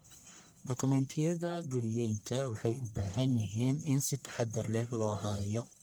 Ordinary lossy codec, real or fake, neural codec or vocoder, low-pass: none; fake; codec, 44.1 kHz, 1.7 kbps, Pupu-Codec; none